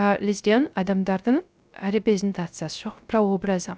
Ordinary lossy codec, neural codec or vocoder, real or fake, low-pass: none; codec, 16 kHz, 0.3 kbps, FocalCodec; fake; none